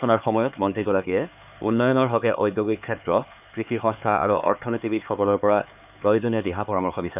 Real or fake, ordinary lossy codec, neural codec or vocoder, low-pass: fake; none; codec, 16 kHz, 4 kbps, X-Codec, HuBERT features, trained on LibriSpeech; 3.6 kHz